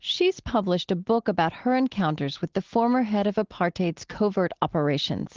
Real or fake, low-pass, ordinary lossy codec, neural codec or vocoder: real; 7.2 kHz; Opus, 16 kbps; none